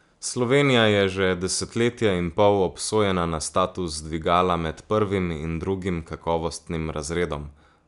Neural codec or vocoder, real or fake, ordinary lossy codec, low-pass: none; real; none; 10.8 kHz